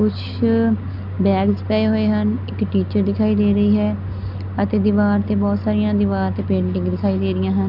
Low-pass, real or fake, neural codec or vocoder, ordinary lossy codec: 5.4 kHz; real; none; none